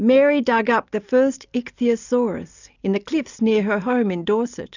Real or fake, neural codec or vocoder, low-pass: real; none; 7.2 kHz